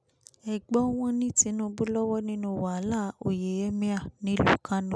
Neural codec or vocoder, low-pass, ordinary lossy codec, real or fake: none; none; none; real